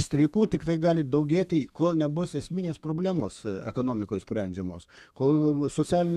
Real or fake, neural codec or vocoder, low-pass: fake; codec, 32 kHz, 1.9 kbps, SNAC; 14.4 kHz